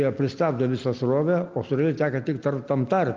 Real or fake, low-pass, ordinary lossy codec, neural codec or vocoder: real; 7.2 kHz; Opus, 24 kbps; none